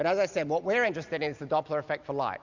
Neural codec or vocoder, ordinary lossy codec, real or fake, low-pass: none; Opus, 64 kbps; real; 7.2 kHz